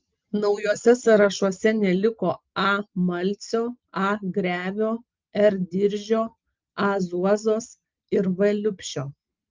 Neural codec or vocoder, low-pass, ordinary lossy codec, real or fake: none; 7.2 kHz; Opus, 32 kbps; real